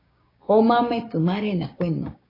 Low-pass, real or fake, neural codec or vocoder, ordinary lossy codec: 5.4 kHz; fake; codec, 16 kHz, 6 kbps, DAC; AAC, 24 kbps